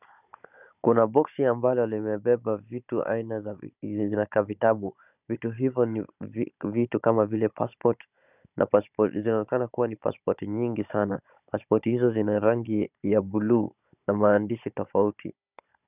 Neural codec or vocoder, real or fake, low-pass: codec, 16 kHz, 16 kbps, FunCodec, trained on Chinese and English, 50 frames a second; fake; 3.6 kHz